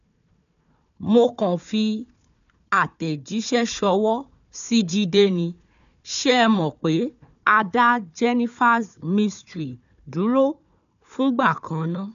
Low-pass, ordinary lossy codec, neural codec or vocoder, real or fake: 7.2 kHz; none; codec, 16 kHz, 4 kbps, FunCodec, trained on Chinese and English, 50 frames a second; fake